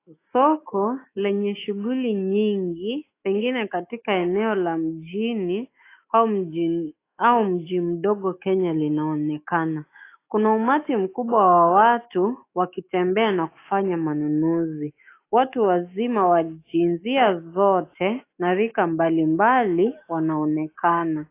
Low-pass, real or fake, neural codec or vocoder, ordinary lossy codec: 3.6 kHz; fake; autoencoder, 48 kHz, 128 numbers a frame, DAC-VAE, trained on Japanese speech; AAC, 24 kbps